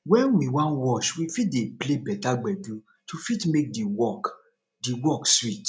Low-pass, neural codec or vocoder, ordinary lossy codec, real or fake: none; none; none; real